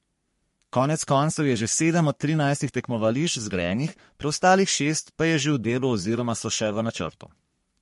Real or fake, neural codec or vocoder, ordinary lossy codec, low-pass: fake; codec, 44.1 kHz, 3.4 kbps, Pupu-Codec; MP3, 48 kbps; 14.4 kHz